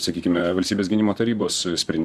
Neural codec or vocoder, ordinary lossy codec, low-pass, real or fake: vocoder, 44.1 kHz, 128 mel bands, Pupu-Vocoder; Opus, 64 kbps; 14.4 kHz; fake